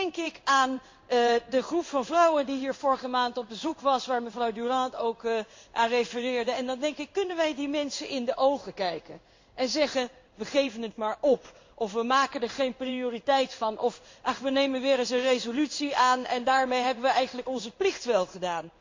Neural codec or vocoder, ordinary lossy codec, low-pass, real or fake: codec, 16 kHz in and 24 kHz out, 1 kbps, XY-Tokenizer; MP3, 48 kbps; 7.2 kHz; fake